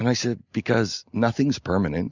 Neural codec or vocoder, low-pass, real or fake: none; 7.2 kHz; real